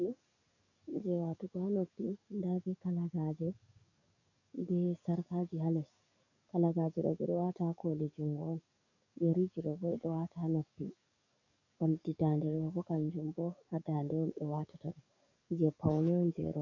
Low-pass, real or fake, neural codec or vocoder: 7.2 kHz; fake; codec, 44.1 kHz, 7.8 kbps, DAC